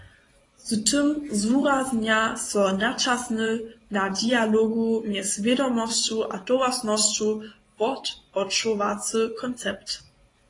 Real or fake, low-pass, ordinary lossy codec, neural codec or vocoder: fake; 10.8 kHz; AAC, 32 kbps; vocoder, 24 kHz, 100 mel bands, Vocos